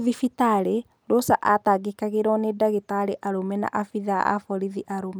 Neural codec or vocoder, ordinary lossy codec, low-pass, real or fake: none; none; none; real